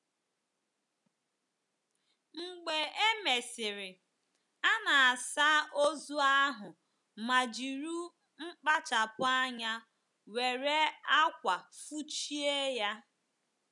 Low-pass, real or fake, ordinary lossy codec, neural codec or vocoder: 10.8 kHz; real; none; none